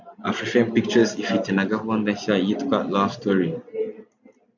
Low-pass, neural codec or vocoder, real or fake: 7.2 kHz; none; real